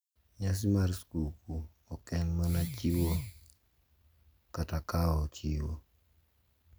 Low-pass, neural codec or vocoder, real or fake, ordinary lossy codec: none; none; real; none